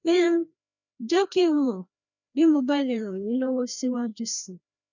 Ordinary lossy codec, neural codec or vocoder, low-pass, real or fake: none; codec, 16 kHz, 1 kbps, FreqCodec, larger model; 7.2 kHz; fake